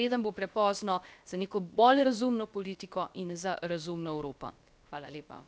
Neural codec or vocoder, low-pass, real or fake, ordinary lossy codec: codec, 16 kHz, about 1 kbps, DyCAST, with the encoder's durations; none; fake; none